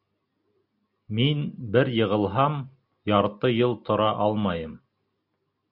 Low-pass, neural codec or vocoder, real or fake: 5.4 kHz; none; real